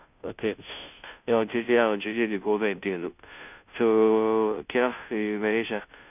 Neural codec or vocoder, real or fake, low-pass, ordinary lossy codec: codec, 16 kHz, 0.5 kbps, FunCodec, trained on Chinese and English, 25 frames a second; fake; 3.6 kHz; none